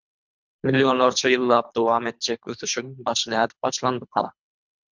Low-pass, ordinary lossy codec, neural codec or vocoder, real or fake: 7.2 kHz; MP3, 64 kbps; codec, 24 kHz, 3 kbps, HILCodec; fake